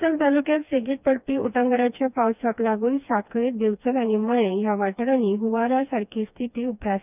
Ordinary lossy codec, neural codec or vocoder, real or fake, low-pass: none; codec, 16 kHz, 2 kbps, FreqCodec, smaller model; fake; 3.6 kHz